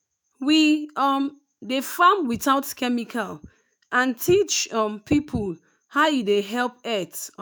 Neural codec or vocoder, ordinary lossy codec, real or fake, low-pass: autoencoder, 48 kHz, 128 numbers a frame, DAC-VAE, trained on Japanese speech; none; fake; none